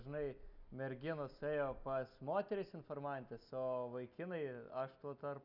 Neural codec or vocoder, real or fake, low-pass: none; real; 5.4 kHz